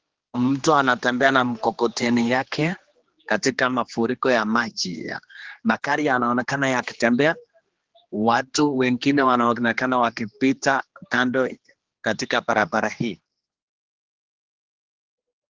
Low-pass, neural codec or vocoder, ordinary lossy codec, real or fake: 7.2 kHz; codec, 16 kHz, 2 kbps, X-Codec, HuBERT features, trained on general audio; Opus, 16 kbps; fake